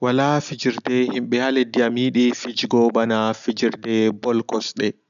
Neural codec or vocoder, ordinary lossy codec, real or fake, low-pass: none; none; real; 7.2 kHz